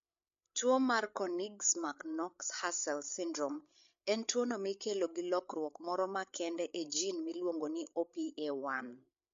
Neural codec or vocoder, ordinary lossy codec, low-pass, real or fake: codec, 16 kHz, 8 kbps, FreqCodec, larger model; MP3, 48 kbps; 7.2 kHz; fake